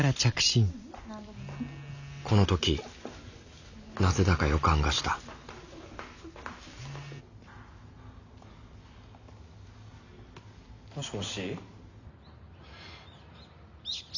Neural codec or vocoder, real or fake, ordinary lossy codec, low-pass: none; real; none; 7.2 kHz